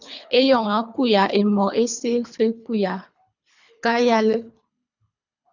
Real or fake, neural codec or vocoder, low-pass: fake; codec, 24 kHz, 3 kbps, HILCodec; 7.2 kHz